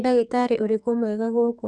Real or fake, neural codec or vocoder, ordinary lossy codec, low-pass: fake; codec, 44.1 kHz, 2.6 kbps, SNAC; none; 10.8 kHz